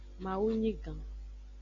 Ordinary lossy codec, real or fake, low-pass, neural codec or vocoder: MP3, 48 kbps; real; 7.2 kHz; none